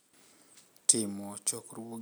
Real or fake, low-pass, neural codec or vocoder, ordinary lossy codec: real; none; none; none